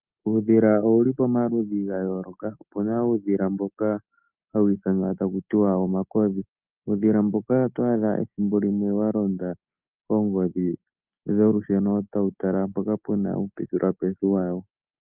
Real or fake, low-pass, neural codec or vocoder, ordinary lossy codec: real; 3.6 kHz; none; Opus, 24 kbps